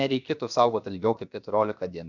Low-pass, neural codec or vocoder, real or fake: 7.2 kHz; codec, 16 kHz, 0.7 kbps, FocalCodec; fake